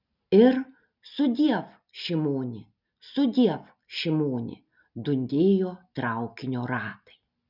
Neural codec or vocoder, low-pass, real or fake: none; 5.4 kHz; real